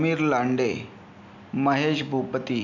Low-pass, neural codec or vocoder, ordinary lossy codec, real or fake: 7.2 kHz; none; none; real